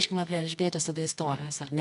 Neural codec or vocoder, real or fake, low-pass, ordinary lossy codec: codec, 24 kHz, 0.9 kbps, WavTokenizer, medium music audio release; fake; 10.8 kHz; MP3, 96 kbps